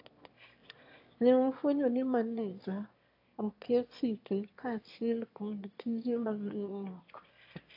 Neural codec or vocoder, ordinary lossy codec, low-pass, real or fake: autoencoder, 22.05 kHz, a latent of 192 numbers a frame, VITS, trained on one speaker; AAC, 32 kbps; 5.4 kHz; fake